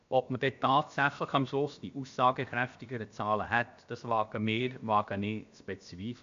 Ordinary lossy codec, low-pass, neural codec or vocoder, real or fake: AAC, 64 kbps; 7.2 kHz; codec, 16 kHz, about 1 kbps, DyCAST, with the encoder's durations; fake